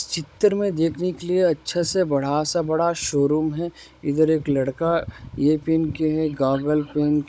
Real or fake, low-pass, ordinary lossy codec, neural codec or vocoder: fake; none; none; codec, 16 kHz, 16 kbps, FunCodec, trained on Chinese and English, 50 frames a second